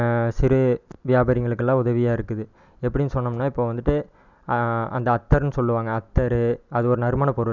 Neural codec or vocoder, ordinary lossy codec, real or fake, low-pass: none; none; real; 7.2 kHz